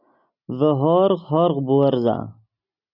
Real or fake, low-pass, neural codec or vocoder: real; 5.4 kHz; none